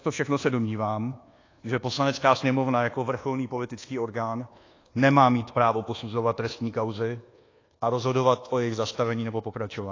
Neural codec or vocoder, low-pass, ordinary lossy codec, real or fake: codec, 24 kHz, 1.2 kbps, DualCodec; 7.2 kHz; AAC, 32 kbps; fake